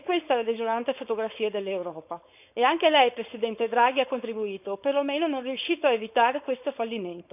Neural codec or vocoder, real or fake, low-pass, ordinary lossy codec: codec, 16 kHz, 4.8 kbps, FACodec; fake; 3.6 kHz; none